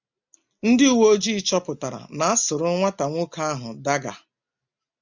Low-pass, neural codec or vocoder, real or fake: 7.2 kHz; none; real